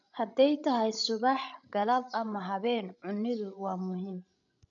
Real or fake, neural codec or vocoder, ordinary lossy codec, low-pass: fake; codec, 16 kHz, 16 kbps, FreqCodec, larger model; none; 7.2 kHz